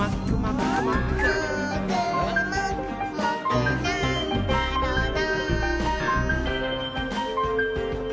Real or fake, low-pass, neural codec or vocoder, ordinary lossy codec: real; none; none; none